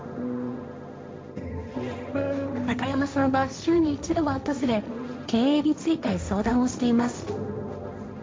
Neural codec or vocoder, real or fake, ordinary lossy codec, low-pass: codec, 16 kHz, 1.1 kbps, Voila-Tokenizer; fake; none; none